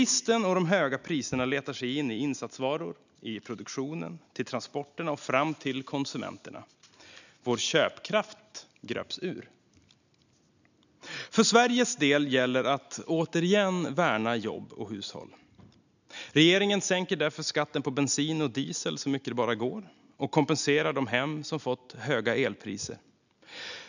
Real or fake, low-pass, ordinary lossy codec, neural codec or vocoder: real; 7.2 kHz; none; none